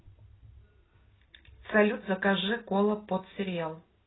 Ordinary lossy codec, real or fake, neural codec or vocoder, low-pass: AAC, 16 kbps; real; none; 7.2 kHz